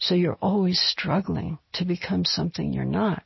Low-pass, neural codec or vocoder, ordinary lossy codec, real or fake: 7.2 kHz; none; MP3, 24 kbps; real